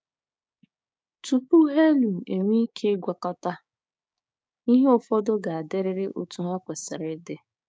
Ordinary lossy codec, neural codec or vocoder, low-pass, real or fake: none; codec, 16 kHz, 6 kbps, DAC; none; fake